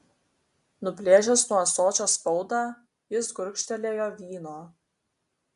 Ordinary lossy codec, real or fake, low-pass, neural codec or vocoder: AAC, 96 kbps; real; 10.8 kHz; none